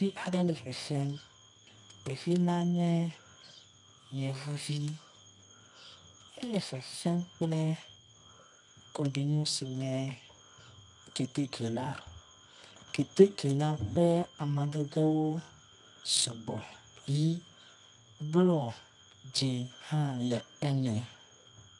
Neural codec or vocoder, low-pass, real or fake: codec, 24 kHz, 0.9 kbps, WavTokenizer, medium music audio release; 10.8 kHz; fake